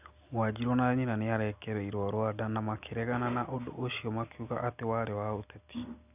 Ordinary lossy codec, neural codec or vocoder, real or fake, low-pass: none; none; real; 3.6 kHz